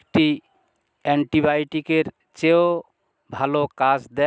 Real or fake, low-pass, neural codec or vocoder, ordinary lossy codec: real; none; none; none